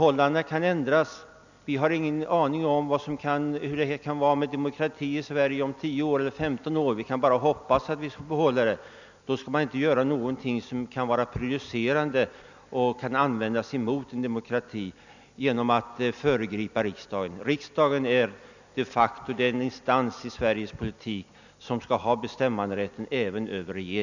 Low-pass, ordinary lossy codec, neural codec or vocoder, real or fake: 7.2 kHz; none; none; real